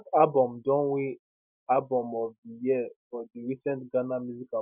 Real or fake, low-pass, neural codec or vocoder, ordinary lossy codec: real; 3.6 kHz; none; none